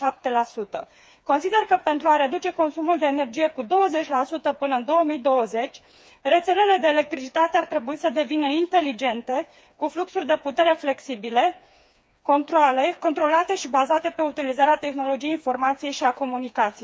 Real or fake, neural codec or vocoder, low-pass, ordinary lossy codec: fake; codec, 16 kHz, 4 kbps, FreqCodec, smaller model; none; none